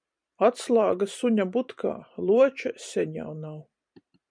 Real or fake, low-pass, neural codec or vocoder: fake; 9.9 kHz; vocoder, 44.1 kHz, 128 mel bands every 512 samples, BigVGAN v2